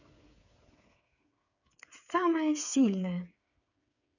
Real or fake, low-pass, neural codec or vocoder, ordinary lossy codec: fake; 7.2 kHz; codec, 16 kHz, 8 kbps, FreqCodec, smaller model; none